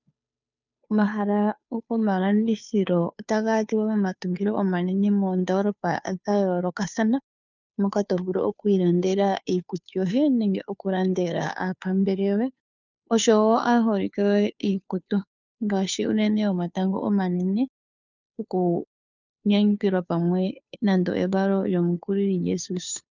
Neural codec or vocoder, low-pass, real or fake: codec, 16 kHz, 2 kbps, FunCodec, trained on Chinese and English, 25 frames a second; 7.2 kHz; fake